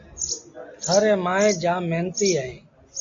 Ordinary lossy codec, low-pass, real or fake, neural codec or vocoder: AAC, 48 kbps; 7.2 kHz; real; none